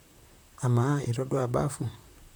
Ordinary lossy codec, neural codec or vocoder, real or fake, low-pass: none; vocoder, 44.1 kHz, 128 mel bands, Pupu-Vocoder; fake; none